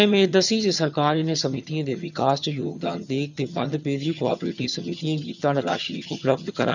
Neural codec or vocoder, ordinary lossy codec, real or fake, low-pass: vocoder, 22.05 kHz, 80 mel bands, HiFi-GAN; none; fake; 7.2 kHz